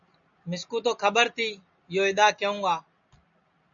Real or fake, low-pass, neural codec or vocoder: real; 7.2 kHz; none